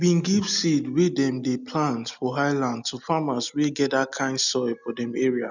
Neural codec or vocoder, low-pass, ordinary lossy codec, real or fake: none; 7.2 kHz; none; real